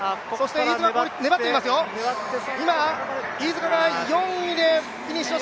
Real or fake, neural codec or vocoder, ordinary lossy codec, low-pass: real; none; none; none